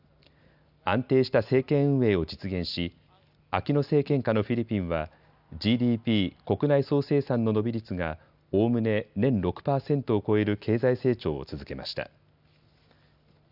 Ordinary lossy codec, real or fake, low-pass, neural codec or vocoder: none; real; 5.4 kHz; none